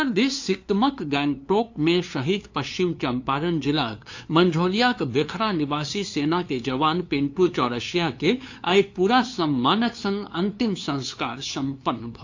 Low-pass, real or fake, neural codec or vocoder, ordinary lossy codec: 7.2 kHz; fake; codec, 16 kHz, 2 kbps, FunCodec, trained on LibriTTS, 25 frames a second; AAC, 48 kbps